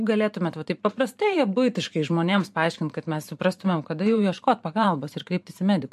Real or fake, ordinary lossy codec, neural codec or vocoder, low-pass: fake; MP3, 64 kbps; vocoder, 44.1 kHz, 128 mel bands every 512 samples, BigVGAN v2; 14.4 kHz